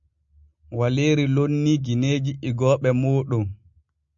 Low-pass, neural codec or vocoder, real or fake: 7.2 kHz; none; real